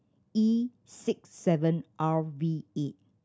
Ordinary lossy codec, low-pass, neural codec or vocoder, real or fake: none; none; none; real